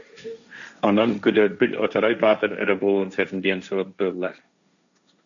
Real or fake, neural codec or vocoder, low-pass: fake; codec, 16 kHz, 1.1 kbps, Voila-Tokenizer; 7.2 kHz